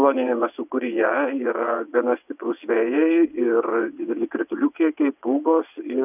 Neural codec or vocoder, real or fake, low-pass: vocoder, 22.05 kHz, 80 mel bands, WaveNeXt; fake; 3.6 kHz